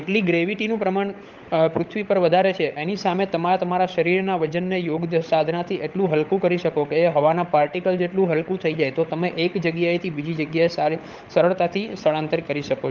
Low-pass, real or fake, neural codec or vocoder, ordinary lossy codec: 7.2 kHz; fake; codec, 16 kHz, 16 kbps, FunCodec, trained on LibriTTS, 50 frames a second; Opus, 24 kbps